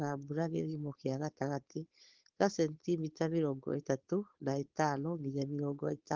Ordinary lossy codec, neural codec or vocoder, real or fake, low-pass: Opus, 16 kbps; codec, 16 kHz, 4.8 kbps, FACodec; fake; 7.2 kHz